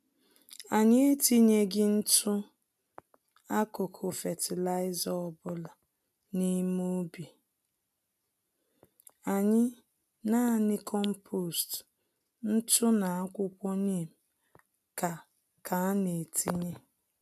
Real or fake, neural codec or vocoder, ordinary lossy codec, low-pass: real; none; none; 14.4 kHz